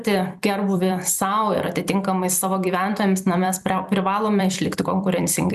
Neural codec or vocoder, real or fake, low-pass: vocoder, 44.1 kHz, 128 mel bands every 256 samples, BigVGAN v2; fake; 14.4 kHz